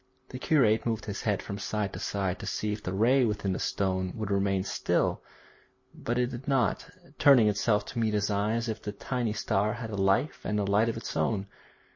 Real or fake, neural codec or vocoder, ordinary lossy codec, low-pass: real; none; MP3, 32 kbps; 7.2 kHz